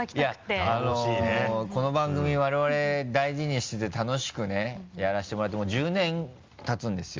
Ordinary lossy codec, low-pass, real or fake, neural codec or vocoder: Opus, 32 kbps; 7.2 kHz; real; none